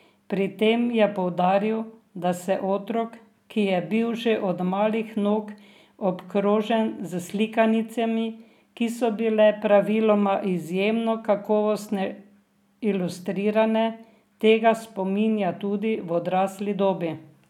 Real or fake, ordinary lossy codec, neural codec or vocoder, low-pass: real; none; none; 19.8 kHz